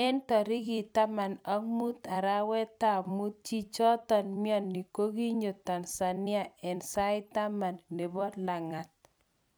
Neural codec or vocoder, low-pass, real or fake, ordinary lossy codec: vocoder, 44.1 kHz, 128 mel bands every 256 samples, BigVGAN v2; none; fake; none